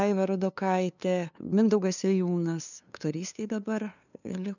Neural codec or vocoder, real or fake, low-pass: codec, 16 kHz, 2 kbps, FunCodec, trained on LibriTTS, 25 frames a second; fake; 7.2 kHz